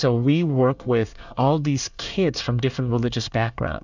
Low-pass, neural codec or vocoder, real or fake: 7.2 kHz; codec, 24 kHz, 1 kbps, SNAC; fake